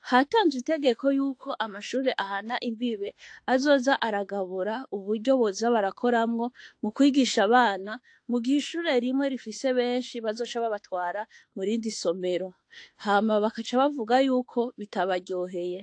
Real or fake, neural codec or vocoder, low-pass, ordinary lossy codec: fake; autoencoder, 48 kHz, 32 numbers a frame, DAC-VAE, trained on Japanese speech; 9.9 kHz; AAC, 48 kbps